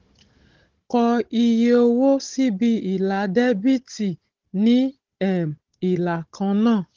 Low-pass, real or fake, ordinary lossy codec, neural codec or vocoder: 7.2 kHz; fake; Opus, 16 kbps; codec, 16 kHz, 16 kbps, FunCodec, trained on Chinese and English, 50 frames a second